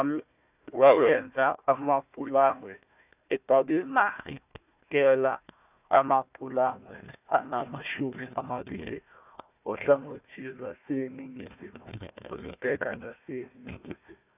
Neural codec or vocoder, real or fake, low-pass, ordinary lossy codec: codec, 16 kHz, 1 kbps, FunCodec, trained on Chinese and English, 50 frames a second; fake; 3.6 kHz; none